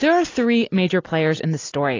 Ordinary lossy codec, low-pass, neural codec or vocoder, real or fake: AAC, 32 kbps; 7.2 kHz; none; real